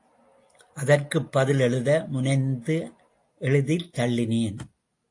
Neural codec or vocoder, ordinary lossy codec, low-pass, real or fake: none; AAC, 48 kbps; 10.8 kHz; real